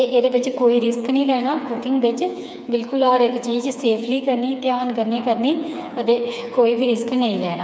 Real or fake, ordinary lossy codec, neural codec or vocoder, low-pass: fake; none; codec, 16 kHz, 4 kbps, FreqCodec, smaller model; none